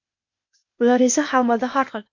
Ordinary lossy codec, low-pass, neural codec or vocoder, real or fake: MP3, 48 kbps; 7.2 kHz; codec, 16 kHz, 0.8 kbps, ZipCodec; fake